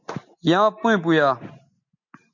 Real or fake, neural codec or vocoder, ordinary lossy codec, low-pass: real; none; MP3, 48 kbps; 7.2 kHz